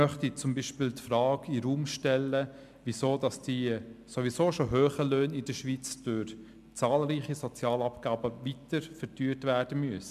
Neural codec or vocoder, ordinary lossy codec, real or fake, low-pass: none; MP3, 96 kbps; real; 14.4 kHz